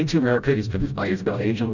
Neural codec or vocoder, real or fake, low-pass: codec, 16 kHz, 0.5 kbps, FreqCodec, smaller model; fake; 7.2 kHz